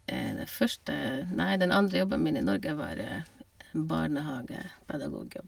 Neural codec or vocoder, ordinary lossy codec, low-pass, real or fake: none; Opus, 32 kbps; 19.8 kHz; real